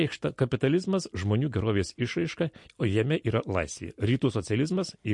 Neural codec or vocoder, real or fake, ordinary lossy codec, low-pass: none; real; MP3, 48 kbps; 10.8 kHz